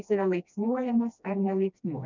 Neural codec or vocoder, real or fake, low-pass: codec, 16 kHz, 1 kbps, FreqCodec, smaller model; fake; 7.2 kHz